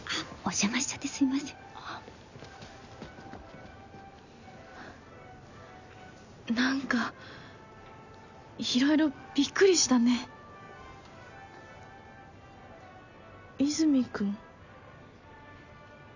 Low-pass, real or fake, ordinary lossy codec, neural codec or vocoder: 7.2 kHz; real; none; none